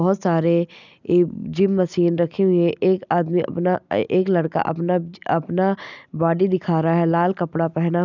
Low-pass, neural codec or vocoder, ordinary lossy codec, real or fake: 7.2 kHz; none; none; real